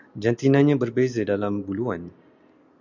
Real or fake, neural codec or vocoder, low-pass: real; none; 7.2 kHz